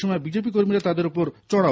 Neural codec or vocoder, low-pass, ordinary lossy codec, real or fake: none; none; none; real